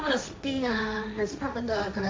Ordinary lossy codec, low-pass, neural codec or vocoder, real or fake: none; none; codec, 16 kHz, 1.1 kbps, Voila-Tokenizer; fake